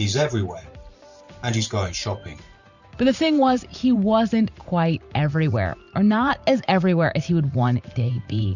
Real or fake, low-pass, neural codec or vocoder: fake; 7.2 kHz; vocoder, 44.1 kHz, 128 mel bands every 512 samples, BigVGAN v2